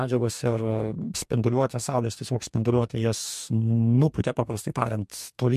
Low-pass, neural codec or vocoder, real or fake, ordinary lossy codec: 14.4 kHz; codec, 44.1 kHz, 2.6 kbps, DAC; fake; MP3, 64 kbps